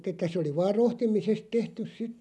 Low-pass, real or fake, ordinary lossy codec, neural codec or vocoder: none; real; none; none